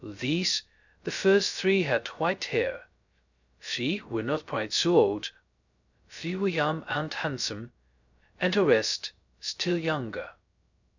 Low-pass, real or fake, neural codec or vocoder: 7.2 kHz; fake; codec, 16 kHz, 0.2 kbps, FocalCodec